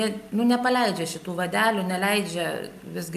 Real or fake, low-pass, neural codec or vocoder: real; 14.4 kHz; none